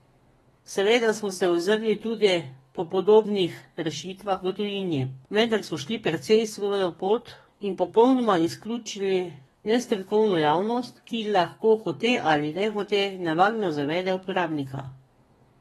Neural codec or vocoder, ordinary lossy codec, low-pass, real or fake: codec, 32 kHz, 1.9 kbps, SNAC; AAC, 32 kbps; 14.4 kHz; fake